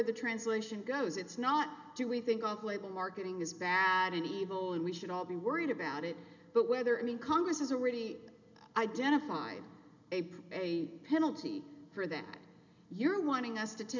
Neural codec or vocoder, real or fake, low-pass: none; real; 7.2 kHz